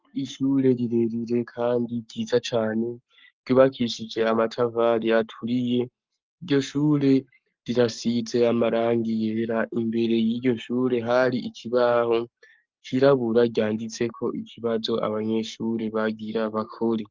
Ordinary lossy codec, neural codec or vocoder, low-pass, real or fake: Opus, 24 kbps; codec, 44.1 kHz, 7.8 kbps, Pupu-Codec; 7.2 kHz; fake